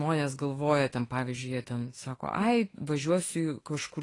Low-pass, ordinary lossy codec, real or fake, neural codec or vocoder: 10.8 kHz; AAC, 32 kbps; fake; autoencoder, 48 kHz, 32 numbers a frame, DAC-VAE, trained on Japanese speech